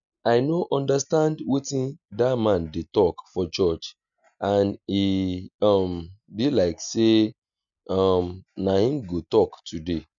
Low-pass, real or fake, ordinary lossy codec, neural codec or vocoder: 7.2 kHz; real; none; none